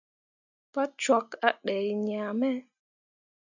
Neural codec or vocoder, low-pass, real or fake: none; 7.2 kHz; real